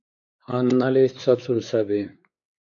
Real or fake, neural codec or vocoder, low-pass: fake; codec, 16 kHz, 4 kbps, X-Codec, WavLM features, trained on Multilingual LibriSpeech; 7.2 kHz